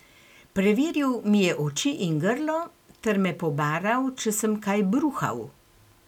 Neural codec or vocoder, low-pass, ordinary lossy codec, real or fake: none; 19.8 kHz; none; real